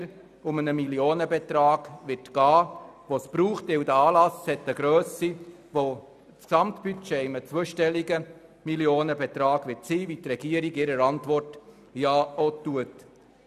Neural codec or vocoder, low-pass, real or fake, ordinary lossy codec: none; 14.4 kHz; real; none